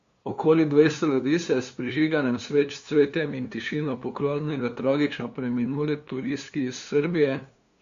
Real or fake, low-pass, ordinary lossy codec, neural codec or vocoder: fake; 7.2 kHz; Opus, 64 kbps; codec, 16 kHz, 2 kbps, FunCodec, trained on LibriTTS, 25 frames a second